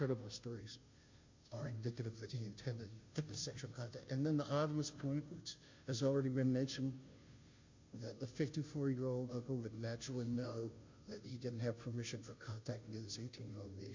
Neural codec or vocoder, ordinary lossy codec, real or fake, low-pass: codec, 16 kHz, 0.5 kbps, FunCodec, trained on Chinese and English, 25 frames a second; MP3, 48 kbps; fake; 7.2 kHz